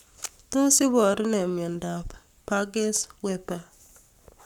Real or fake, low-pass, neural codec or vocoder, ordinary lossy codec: fake; 19.8 kHz; codec, 44.1 kHz, 7.8 kbps, Pupu-Codec; none